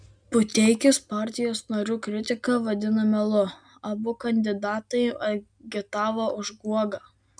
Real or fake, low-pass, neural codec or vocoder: real; 9.9 kHz; none